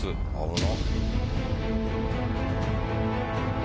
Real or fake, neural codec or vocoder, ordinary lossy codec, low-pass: real; none; none; none